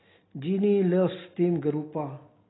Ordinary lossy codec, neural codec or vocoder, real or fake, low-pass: AAC, 16 kbps; none; real; 7.2 kHz